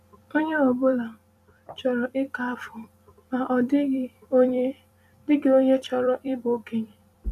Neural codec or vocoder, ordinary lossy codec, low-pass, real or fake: vocoder, 44.1 kHz, 128 mel bands every 256 samples, BigVGAN v2; none; 14.4 kHz; fake